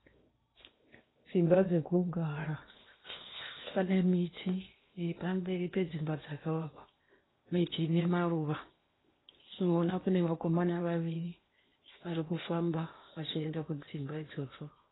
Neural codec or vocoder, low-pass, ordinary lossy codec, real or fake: codec, 16 kHz in and 24 kHz out, 0.8 kbps, FocalCodec, streaming, 65536 codes; 7.2 kHz; AAC, 16 kbps; fake